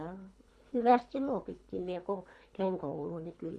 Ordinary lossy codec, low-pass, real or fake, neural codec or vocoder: none; none; fake; codec, 24 kHz, 3 kbps, HILCodec